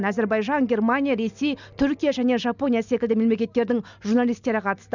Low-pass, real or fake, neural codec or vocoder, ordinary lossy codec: 7.2 kHz; real; none; none